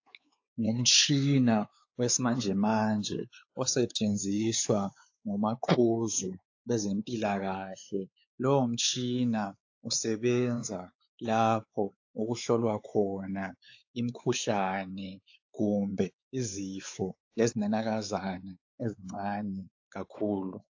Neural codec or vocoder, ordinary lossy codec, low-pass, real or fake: codec, 16 kHz, 4 kbps, X-Codec, WavLM features, trained on Multilingual LibriSpeech; AAC, 48 kbps; 7.2 kHz; fake